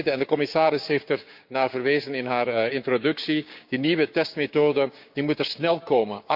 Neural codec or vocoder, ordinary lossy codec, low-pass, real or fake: codec, 16 kHz, 6 kbps, DAC; none; 5.4 kHz; fake